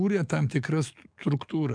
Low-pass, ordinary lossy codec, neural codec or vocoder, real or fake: 9.9 kHz; MP3, 96 kbps; codec, 44.1 kHz, 7.8 kbps, DAC; fake